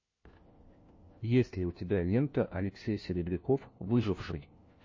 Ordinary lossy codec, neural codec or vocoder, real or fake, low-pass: MP3, 32 kbps; codec, 16 kHz, 1 kbps, FunCodec, trained on LibriTTS, 50 frames a second; fake; 7.2 kHz